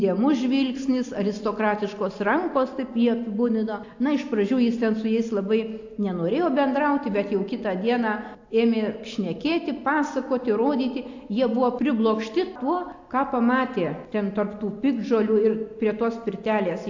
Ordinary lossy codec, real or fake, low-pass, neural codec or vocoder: AAC, 48 kbps; real; 7.2 kHz; none